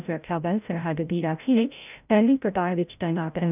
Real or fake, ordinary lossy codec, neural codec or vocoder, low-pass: fake; none; codec, 16 kHz, 0.5 kbps, FreqCodec, larger model; 3.6 kHz